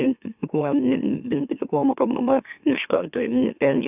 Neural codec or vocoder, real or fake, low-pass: autoencoder, 44.1 kHz, a latent of 192 numbers a frame, MeloTTS; fake; 3.6 kHz